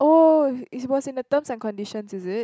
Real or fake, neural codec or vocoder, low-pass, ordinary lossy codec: real; none; none; none